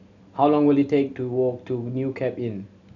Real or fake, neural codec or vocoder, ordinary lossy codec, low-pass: real; none; none; 7.2 kHz